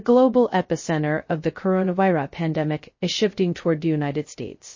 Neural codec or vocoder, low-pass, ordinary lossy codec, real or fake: codec, 16 kHz, 0.2 kbps, FocalCodec; 7.2 kHz; MP3, 32 kbps; fake